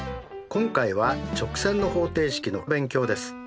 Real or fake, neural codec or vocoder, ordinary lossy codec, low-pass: real; none; none; none